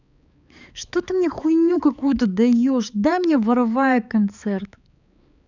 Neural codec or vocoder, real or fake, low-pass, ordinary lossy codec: codec, 16 kHz, 4 kbps, X-Codec, HuBERT features, trained on balanced general audio; fake; 7.2 kHz; none